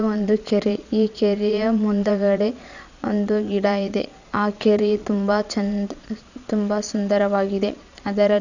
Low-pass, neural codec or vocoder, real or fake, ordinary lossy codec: 7.2 kHz; vocoder, 22.05 kHz, 80 mel bands, Vocos; fake; none